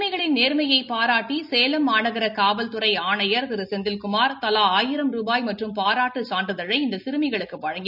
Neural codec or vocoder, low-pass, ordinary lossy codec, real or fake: none; 5.4 kHz; none; real